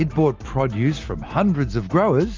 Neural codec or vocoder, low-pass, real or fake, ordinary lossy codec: none; 7.2 kHz; real; Opus, 24 kbps